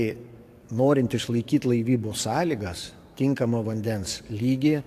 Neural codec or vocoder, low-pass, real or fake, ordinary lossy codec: codec, 44.1 kHz, 7.8 kbps, DAC; 14.4 kHz; fake; AAC, 64 kbps